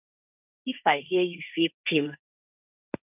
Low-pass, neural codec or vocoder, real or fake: 3.6 kHz; codec, 16 kHz, 1.1 kbps, Voila-Tokenizer; fake